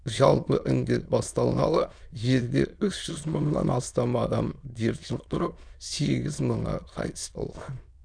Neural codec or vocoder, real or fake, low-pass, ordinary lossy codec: autoencoder, 22.05 kHz, a latent of 192 numbers a frame, VITS, trained on many speakers; fake; 9.9 kHz; none